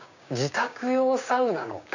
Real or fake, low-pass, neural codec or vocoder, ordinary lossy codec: fake; 7.2 kHz; codec, 16 kHz, 6 kbps, DAC; none